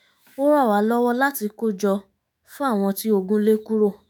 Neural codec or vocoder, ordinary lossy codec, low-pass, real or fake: autoencoder, 48 kHz, 128 numbers a frame, DAC-VAE, trained on Japanese speech; none; none; fake